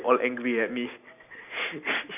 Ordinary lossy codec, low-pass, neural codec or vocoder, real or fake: none; 3.6 kHz; none; real